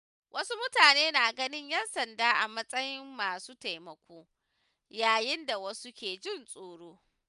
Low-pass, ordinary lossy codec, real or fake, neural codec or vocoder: 10.8 kHz; none; real; none